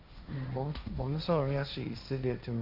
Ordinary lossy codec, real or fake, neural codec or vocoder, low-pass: none; fake; codec, 16 kHz, 1.1 kbps, Voila-Tokenizer; 5.4 kHz